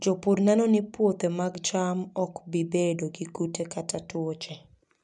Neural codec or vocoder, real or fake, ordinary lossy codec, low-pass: none; real; none; 10.8 kHz